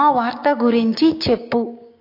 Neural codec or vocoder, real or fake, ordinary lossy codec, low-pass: codec, 16 kHz, 6 kbps, DAC; fake; AAC, 32 kbps; 5.4 kHz